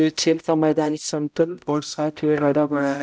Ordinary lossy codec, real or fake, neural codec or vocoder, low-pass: none; fake; codec, 16 kHz, 0.5 kbps, X-Codec, HuBERT features, trained on balanced general audio; none